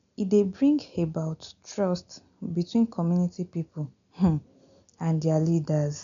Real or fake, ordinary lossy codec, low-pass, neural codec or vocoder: real; MP3, 96 kbps; 7.2 kHz; none